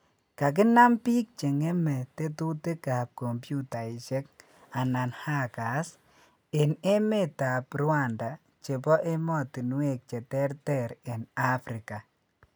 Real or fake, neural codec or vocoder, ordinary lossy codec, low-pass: real; none; none; none